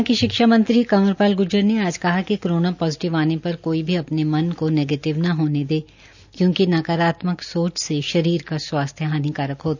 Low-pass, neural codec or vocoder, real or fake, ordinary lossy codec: 7.2 kHz; none; real; none